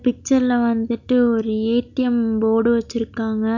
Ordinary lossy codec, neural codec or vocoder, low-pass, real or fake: none; none; 7.2 kHz; real